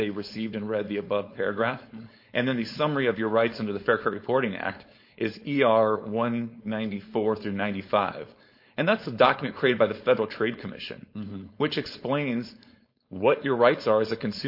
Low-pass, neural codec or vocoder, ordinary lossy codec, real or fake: 5.4 kHz; codec, 16 kHz, 4.8 kbps, FACodec; MP3, 32 kbps; fake